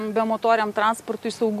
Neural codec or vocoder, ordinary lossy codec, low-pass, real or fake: none; MP3, 64 kbps; 14.4 kHz; real